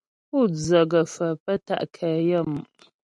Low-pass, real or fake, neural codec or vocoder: 10.8 kHz; real; none